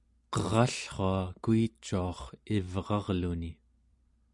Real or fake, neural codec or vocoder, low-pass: real; none; 10.8 kHz